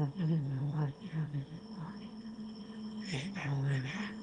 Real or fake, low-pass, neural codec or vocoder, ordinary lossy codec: fake; 9.9 kHz; autoencoder, 22.05 kHz, a latent of 192 numbers a frame, VITS, trained on one speaker; Opus, 24 kbps